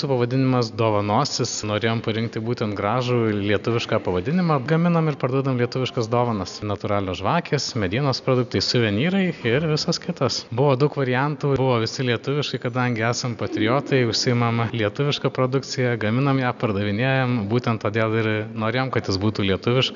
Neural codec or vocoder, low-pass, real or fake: none; 7.2 kHz; real